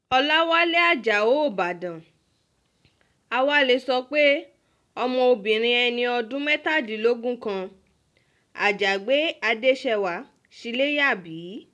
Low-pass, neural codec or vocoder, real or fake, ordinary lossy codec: none; none; real; none